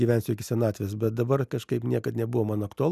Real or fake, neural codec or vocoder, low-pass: real; none; 14.4 kHz